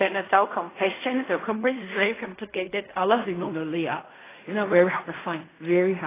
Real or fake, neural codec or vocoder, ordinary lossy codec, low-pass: fake; codec, 16 kHz in and 24 kHz out, 0.4 kbps, LongCat-Audio-Codec, fine tuned four codebook decoder; AAC, 16 kbps; 3.6 kHz